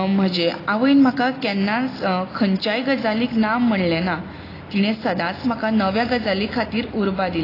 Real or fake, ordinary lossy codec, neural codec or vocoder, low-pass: real; AAC, 24 kbps; none; 5.4 kHz